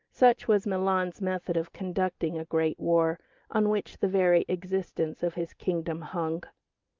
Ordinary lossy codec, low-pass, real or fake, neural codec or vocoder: Opus, 24 kbps; 7.2 kHz; real; none